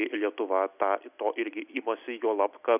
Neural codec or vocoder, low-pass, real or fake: none; 3.6 kHz; real